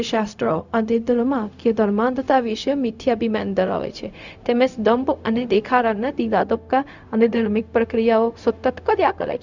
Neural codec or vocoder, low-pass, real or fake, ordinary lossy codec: codec, 16 kHz, 0.4 kbps, LongCat-Audio-Codec; 7.2 kHz; fake; none